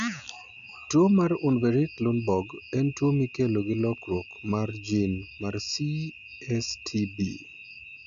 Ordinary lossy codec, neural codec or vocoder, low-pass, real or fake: none; none; 7.2 kHz; real